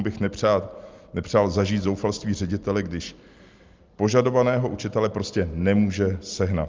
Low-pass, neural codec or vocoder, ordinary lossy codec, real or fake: 7.2 kHz; none; Opus, 32 kbps; real